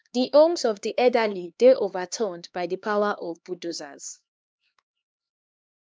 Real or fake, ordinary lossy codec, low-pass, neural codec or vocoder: fake; none; none; codec, 16 kHz, 2 kbps, X-Codec, HuBERT features, trained on LibriSpeech